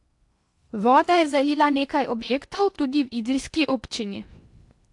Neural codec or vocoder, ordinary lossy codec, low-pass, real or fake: codec, 16 kHz in and 24 kHz out, 0.8 kbps, FocalCodec, streaming, 65536 codes; AAC, 64 kbps; 10.8 kHz; fake